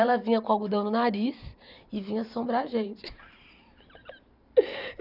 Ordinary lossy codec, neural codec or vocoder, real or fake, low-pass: none; vocoder, 44.1 kHz, 128 mel bands every 512 samples, BigVGAN v2; fake; 5.4 kHz